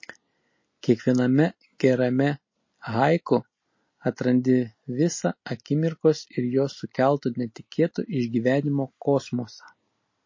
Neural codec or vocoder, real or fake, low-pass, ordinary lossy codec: none; real; 7.2 kHz; MP3, 32 kbps